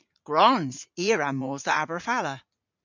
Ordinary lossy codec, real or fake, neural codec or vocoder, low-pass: MP3, 64 kbps; fake; vocoder, 44.1 kHz, 80 mel bands, Vocos; 7.2 kHz